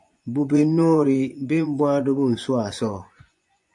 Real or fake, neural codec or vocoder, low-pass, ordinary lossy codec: fake; vocoder, 24 kHz, 100 mel bands, Vocos; 10.8 kHz; MP3, 64 kbps